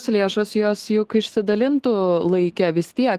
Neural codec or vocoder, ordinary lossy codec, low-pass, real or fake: autoencoder, 48 kHz, 128 numbers a frame, DAC-VAE, trained on Japanese speech; Opus, 16 kbps; 14.4 kHz; fake